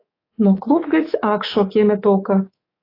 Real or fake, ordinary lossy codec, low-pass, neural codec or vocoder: fake; AAC, 24 kbps; 5.4 kHz; codec, 24 kHz, 3.1 kbps, DualCodec